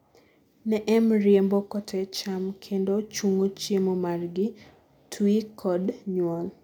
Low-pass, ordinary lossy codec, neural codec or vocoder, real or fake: 19.8 kHz; none; none; real